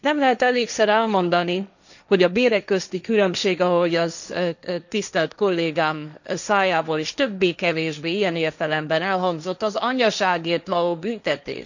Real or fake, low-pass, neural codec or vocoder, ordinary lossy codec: fake; 7.2 kHz; codec, 16 kHz, 1.1 kbps, Voila-Tokenizer; none